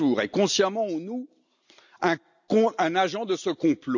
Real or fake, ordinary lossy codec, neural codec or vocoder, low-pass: real; none; none; 7.2 kHz